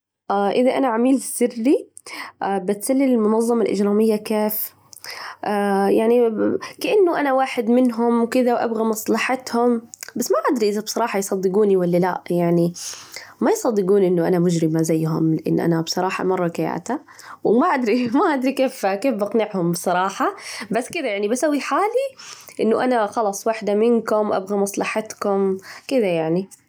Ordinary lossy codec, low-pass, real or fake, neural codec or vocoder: none; none; real; none